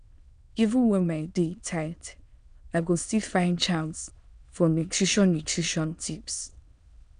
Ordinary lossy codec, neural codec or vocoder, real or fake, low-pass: none; autoencoder, 22.05 kHz, a latent of 192 numbers a frame, VITS, trained on many speakers; fake; 9.9 kHz